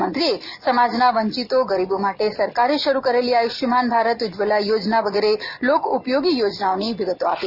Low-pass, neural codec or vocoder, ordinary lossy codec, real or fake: 5.4 kHz; none; none; real